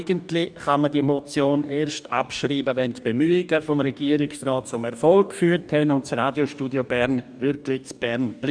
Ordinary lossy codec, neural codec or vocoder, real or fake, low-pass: none; codec, 44.1 kHz, 2.6 kbps, DAC; fake; 9.9 kHz